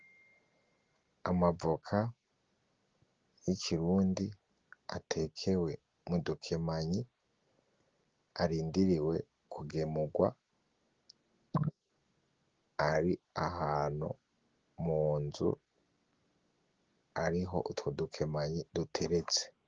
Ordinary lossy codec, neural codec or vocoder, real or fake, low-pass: Opus, 16 kbps; none; real; 7.2 kHz